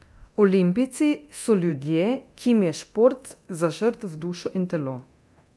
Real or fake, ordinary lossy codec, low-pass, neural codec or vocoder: fake; none; none; codec, 24 kHz, 0.9 kbps, DualCodec